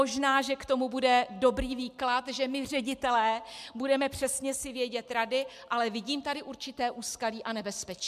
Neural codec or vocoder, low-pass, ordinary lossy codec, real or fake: none; 14.4 kHz; MP3, 96 kbps; real